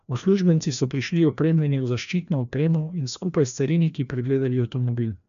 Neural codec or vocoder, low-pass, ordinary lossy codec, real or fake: codec, 16 kHz, 1 kbps, FreqCodec, larger model; 7.2 kHz; none; fake